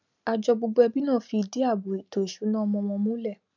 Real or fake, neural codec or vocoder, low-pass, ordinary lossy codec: real; none; 7.2 kHz; none